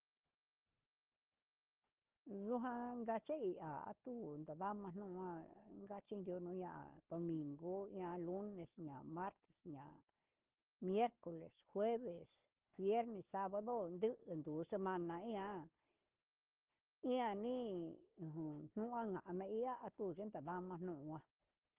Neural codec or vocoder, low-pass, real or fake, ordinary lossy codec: codec, 16 kHz, 4 kbps, FunCodec, trained on Chinese and English, 50 frames a second; 3.6 kHz; fake; Opus, 16 kbps